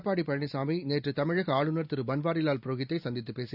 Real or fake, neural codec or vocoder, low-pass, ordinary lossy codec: real; none; 5.4 kHz; none